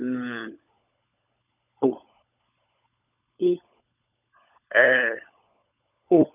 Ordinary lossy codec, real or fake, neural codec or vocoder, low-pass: none; fake; codec, 16 kHz, 16 kbps, FunCodec, trained on LibriTTS, 50 frames a second; 3.6 kHz